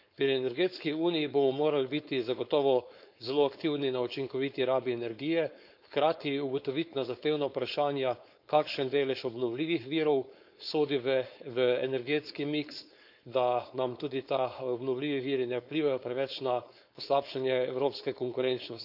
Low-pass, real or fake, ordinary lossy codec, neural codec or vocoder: 5.4 kHz; fake; none; codec, 16 kHz, 4.8 kbps, FACodec